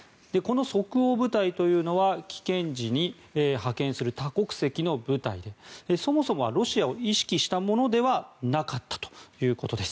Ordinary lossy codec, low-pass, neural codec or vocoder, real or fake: none; none; none; real